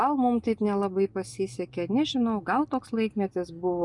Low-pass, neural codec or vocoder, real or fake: 10.8 kHz; none; real